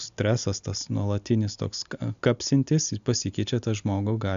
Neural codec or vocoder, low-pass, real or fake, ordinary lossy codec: none; 7.2 kHz; real; AAC, 96 kbps